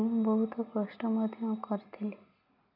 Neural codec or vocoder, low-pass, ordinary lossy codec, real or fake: none; 5.4 kHz; none; real